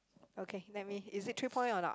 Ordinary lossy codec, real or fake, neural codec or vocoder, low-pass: none; real; none; none